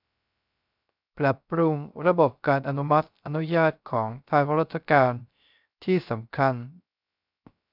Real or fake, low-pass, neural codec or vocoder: fake; 5.4 kHz; codec, 16 kHz, 0.3 kbps, FocalCodec